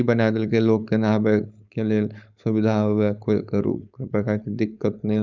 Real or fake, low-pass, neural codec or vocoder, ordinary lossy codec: fake; 7.2 kHz; codec, 16 kHz, 4.8 kbps, FACodec; none